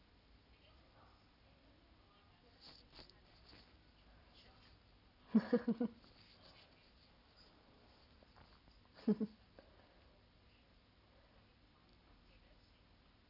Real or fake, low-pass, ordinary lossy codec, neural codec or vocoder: real; 5.4 kHz; none; none